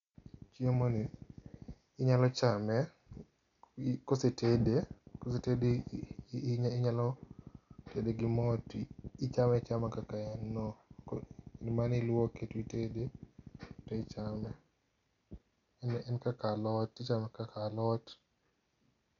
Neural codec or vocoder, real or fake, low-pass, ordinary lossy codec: none; real; 7.2 kHz; MP3, 96 kbps